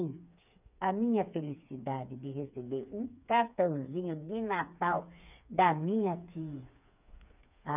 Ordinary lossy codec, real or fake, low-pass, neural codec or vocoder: none; fake; 3.6 kHz; codec, 16 kHz, 4 kbps, FreqCodec, smaller model